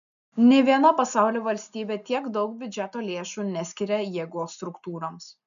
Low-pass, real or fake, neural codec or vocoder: 7.2 kHz; real; none